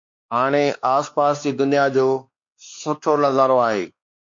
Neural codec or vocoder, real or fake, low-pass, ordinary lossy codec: codec, 16 kHz, 2 kbps, X-Codec, WavLM features, trained on Multilingual LibriSpeech; fake; 7.2 kHz; MP3, 48 kbps